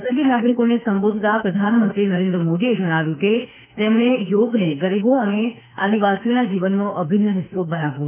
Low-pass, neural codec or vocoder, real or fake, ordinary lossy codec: 3.6 kHz; autoencoder, 48 kHz, 32 numbers a frame, DAC-VAE, trained on Japanese speech; fake; none